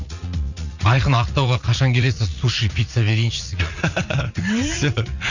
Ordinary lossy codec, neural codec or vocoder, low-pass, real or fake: none; vocoder, 44.1 kHz, 80 mel bands, Vocos; 7.2 kHz; fake